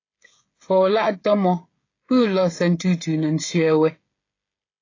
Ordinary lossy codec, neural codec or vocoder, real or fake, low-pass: AAC, 32 kbps; codec, 16 kHz, 16 kbps, FreqCodec, smaller model; fake; 7.2 kHz